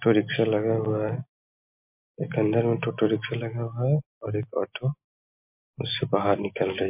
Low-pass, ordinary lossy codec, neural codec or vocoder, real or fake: 3.6 kHz; MP3, 32 kbps; none; real